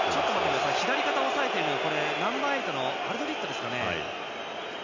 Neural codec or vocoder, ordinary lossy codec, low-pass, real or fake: none; none; 7.2 kHz; real